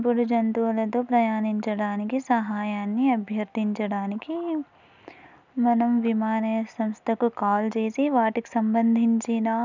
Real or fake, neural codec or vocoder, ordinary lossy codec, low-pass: real; none; none; 7.2 kHz